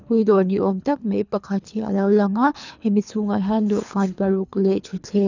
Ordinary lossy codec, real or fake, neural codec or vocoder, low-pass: none; fake; codec, 24 kHz, 3 kbps, HILCodec; 7.2 kHz